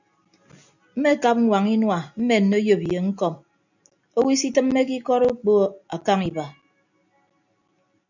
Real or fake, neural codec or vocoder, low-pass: real; none; 7.2 kHz